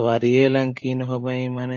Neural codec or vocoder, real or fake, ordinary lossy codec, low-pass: codec, 16 kHz, 16 kbps, FunCodec, trained on LibriTTS, 50 frames a second; fake; AAC, 48 kbps; 7.2 kHz